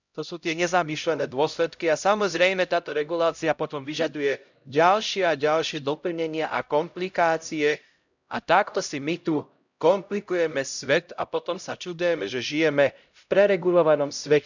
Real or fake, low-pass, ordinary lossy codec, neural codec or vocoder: fake; 7.2 kHz; none; codec, 16 kHz, 0.5 kbps, X-Codec, HuBERT features, trained on LibriSpeech